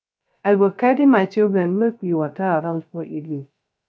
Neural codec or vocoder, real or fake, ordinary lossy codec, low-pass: codec, 16 kHz, 0.3 kbps, FocalCodec; fake; none; none